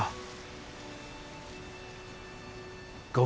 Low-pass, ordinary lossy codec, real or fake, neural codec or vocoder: none; none; real; none